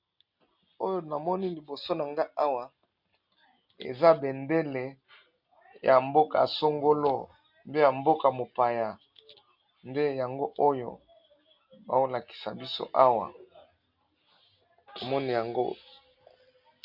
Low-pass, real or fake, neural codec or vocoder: 5.4 kHz; real; none